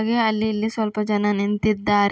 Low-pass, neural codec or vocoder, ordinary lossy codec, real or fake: none; none; none; real